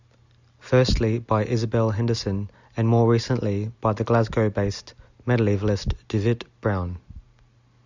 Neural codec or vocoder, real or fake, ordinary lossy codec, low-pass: none; real; Opus, 64 kbps; 7.2 kHz